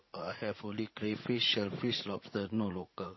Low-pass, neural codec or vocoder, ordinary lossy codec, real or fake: 7.2 kHz; vocoder, 44.1 kHz, 80 mel bands, Vocos; MP3, 24 kbps; fake